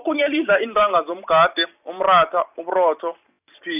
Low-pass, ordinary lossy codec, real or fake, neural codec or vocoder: 3.6 kHz; none; real; none